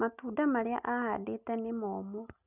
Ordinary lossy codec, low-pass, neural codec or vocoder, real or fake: none; 3.6 kHz; none; real